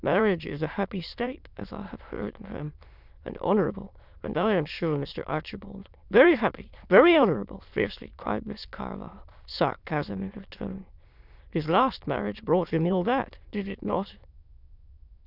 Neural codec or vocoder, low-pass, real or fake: autoencoder, 22.05 kHz, a latent of 192 numbers a frame, VITS, trained on many speakers; 5.4 kHz; fake